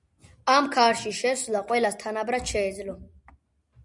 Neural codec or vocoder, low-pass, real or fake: none; 10.8 kHz; real